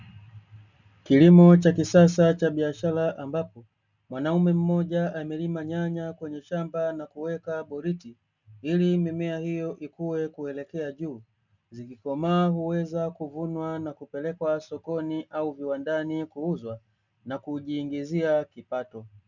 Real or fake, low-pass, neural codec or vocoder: real; 7.2 kHz; none